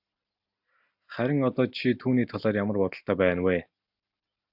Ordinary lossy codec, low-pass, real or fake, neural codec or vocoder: AAC, 48 kbps; 5.4 kHz; real; none